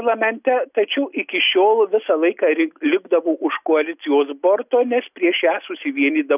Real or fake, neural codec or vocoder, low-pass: real; none; 3.6 kHz